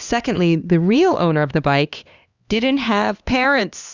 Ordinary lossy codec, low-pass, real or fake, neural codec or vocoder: Opus, 64 kbps; 7.2 kHz; fake; codec, 16 kHz, 2 kbps, X-Codec, HuBERT features, trained on LibriSpeech